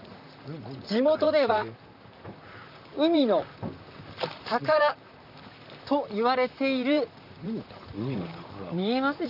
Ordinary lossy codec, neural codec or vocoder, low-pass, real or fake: none; vocoder, 44.1 kHz, 128 mel bands, Pupu-Vocoder; 5.4 kHz; fake